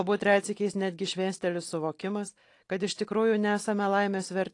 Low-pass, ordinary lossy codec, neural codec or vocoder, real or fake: 10.8 kHz; AAC, 48 kbps; none; real